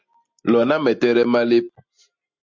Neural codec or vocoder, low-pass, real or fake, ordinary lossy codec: none; 7.2 kHz; real; MP3, 48 kbps